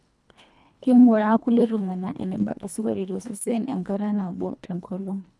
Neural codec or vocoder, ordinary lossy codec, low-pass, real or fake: codec, 24 kHz, 1.5 kbps, HILCodec; none; none; fake